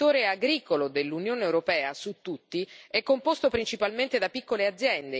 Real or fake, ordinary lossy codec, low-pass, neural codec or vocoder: real; none; none; none